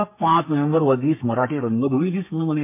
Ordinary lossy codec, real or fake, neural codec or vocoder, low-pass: MP3, 24 kbps; fake; codec, 32 kHz, 1.9 kbps, SNAC; 3.6 kHz